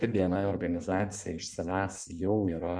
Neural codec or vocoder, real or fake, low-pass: codec, 16 kHz in and 24 kHz out, 1.1 kbps, FireRedTTS-2 codec; fake; 9.9 kHz